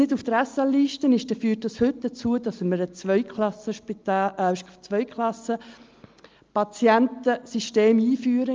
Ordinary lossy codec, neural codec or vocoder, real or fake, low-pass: Opus, 24 kbps; none; real; 7.2 kHz